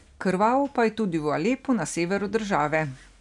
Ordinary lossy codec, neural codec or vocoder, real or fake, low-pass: none; none; real; 10.8 kHz